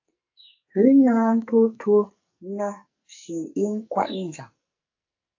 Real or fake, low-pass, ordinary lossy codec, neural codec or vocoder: fake; 7.2 kHz; AAC, 48 kbps; codec, 44.1 kHz, 2.6 kbps, SNAC